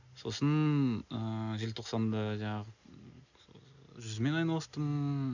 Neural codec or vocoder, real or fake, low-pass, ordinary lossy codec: none; real; 7.2 kHz; none